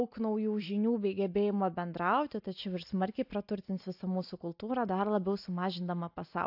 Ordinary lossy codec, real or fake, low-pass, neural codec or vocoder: AAC, 48 kbps; real; 5.4 kHz; none